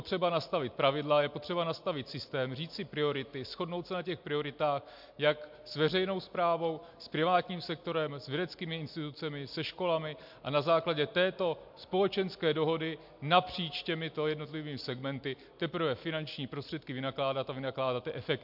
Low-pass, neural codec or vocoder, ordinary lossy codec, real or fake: 5.4 kHz; none; MP3, 48 kbps; real